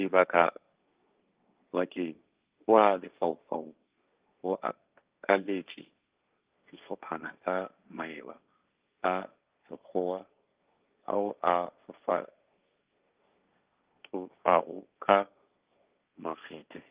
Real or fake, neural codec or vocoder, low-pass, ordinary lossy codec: fake; codec, 16 kHz, 1.1 kbps, Voila-Tokenizer; 3.6 kHz; Opus, 24 kbps